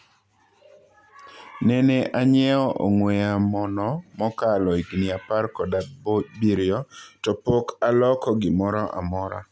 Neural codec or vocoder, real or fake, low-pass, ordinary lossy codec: none; real; none; none